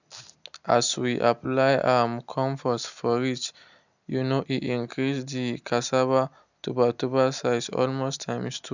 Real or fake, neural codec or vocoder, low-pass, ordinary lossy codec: real; none; 7.2 kHz; none